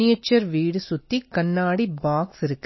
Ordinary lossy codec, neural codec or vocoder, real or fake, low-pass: MP3, 24 kbps; none; real; 7.2 kHz